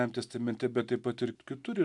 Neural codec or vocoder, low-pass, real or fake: none; 10.8 kHz; real